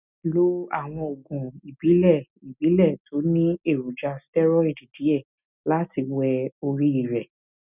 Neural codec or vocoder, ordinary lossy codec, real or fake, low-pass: none; none; real; 3.6 kHz